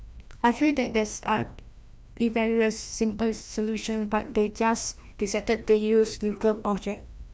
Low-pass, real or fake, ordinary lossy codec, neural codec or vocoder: none; fake; none; codec, 16 kHz, 1 kbps, FreqCodec, larger model